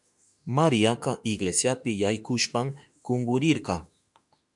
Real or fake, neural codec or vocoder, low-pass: fake; autoencoder, 48 kHz, 32 numbers a frame, DAC-VAE, trained on Japanese speech; 10.8 kHz